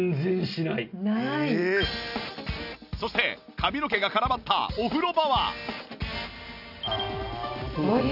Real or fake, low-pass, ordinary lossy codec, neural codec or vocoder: real; 5.4 kHz; none; none